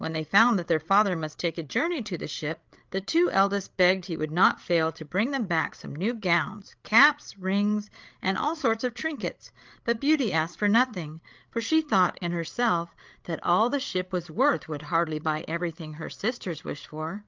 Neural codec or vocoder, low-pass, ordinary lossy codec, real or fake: codec, 16 kHz, 8 kbps, FreqCodec, larger model; 7.2 kHz; Opus, 24 kbps; fake